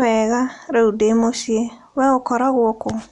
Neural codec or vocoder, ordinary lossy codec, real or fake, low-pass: none; none; real; 10.8 kHz